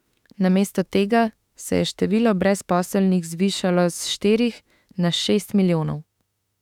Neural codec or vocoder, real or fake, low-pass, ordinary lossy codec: autoencoder, 48 kHz, 32 numbers a frame, DAC-VAE, trained on Japanese speech; fake; 19.8 kHz; none